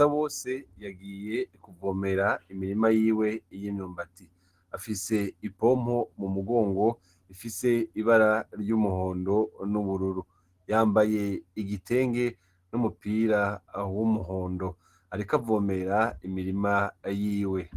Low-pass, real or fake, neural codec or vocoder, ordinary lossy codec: 14.4 kHz; real; none; Opus, 16 kbps